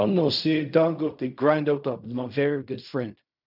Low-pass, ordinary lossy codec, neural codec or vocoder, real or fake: 5.4 kHz; none; codec, 16 kHz in and 24 kHz out, 0.4 kbps, LongCat-Audio-Codec, fine tuned four codebook decoder; fake